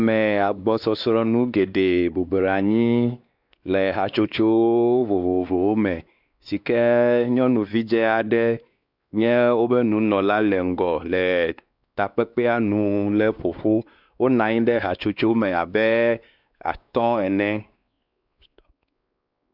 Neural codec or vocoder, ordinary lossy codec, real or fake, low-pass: codec, 16 kHz, 2 kbps, X-Codec, WavLM features, trained on Multilingual LibriSpeech; Opus, 64 kbps; fake; 5.4 kHz